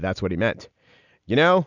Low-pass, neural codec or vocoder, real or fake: 7.2 kHz; none; real